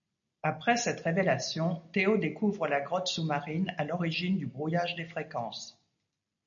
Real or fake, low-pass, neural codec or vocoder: real; 7.2 kHz; none